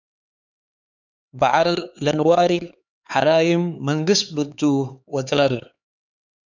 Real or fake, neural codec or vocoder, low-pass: fake; codec, 16 kHz, 4 kbps, X-Codec, HuBERT features, trained on LibriSpeech; 7.2 kHz